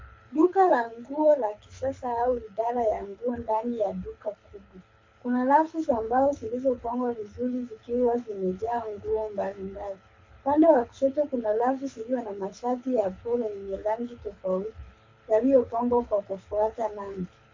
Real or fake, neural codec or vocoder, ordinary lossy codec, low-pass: fake; codec, 24 kHz, 6 kbps, HILCodec; MP3, 48 kbps; 7.2 kHz